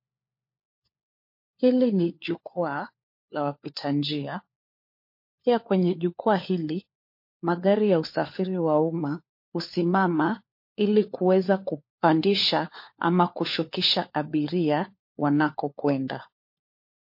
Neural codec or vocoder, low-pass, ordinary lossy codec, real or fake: codec, 16 kHz, 4 kbps, FunCodec, trained on LibriTTS, 50 frames a second; 5.4 kHz; MP3, 32 kbps; fake